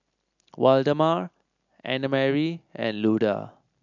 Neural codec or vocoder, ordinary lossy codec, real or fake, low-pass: none; none; real; 7.2 kHz